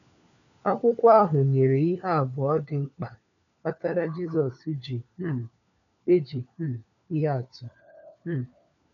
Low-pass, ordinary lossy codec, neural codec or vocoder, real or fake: 7.2 kHz; none; codec, 16 kHz, 4 kbps, FunCodec, trained on LibriTTS, 50 frames a second; fake